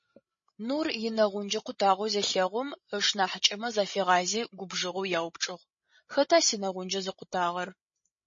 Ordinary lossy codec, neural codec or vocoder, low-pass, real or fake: MP3, 32 kbps; codec, 16 kHz, 16 kbps, FreqCodec, larger model; 7.2 kHz; fake